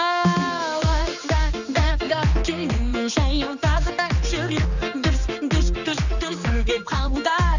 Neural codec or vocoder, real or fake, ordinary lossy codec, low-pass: codec, 16 kHz, 2 kbps, X-Codec, HuBERT features, trained on general audio; fake; none; 7.2 kHz